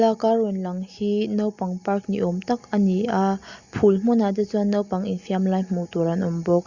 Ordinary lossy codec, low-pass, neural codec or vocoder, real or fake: Opus, 64 kbps; 7.2 kHz; none; real